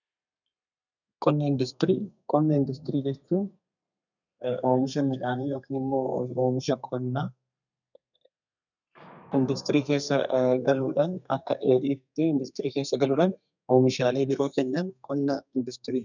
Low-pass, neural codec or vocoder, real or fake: 7.2 kHz; codec, 32 kHz, 1.9 kbps, SNAC; fake